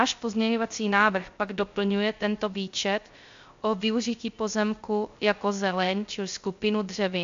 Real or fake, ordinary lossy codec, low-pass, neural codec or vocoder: fake; AAC, 64 kbps; 7.2 kHz; codec, 16 kHz, 0.3 kbps, FocalCodec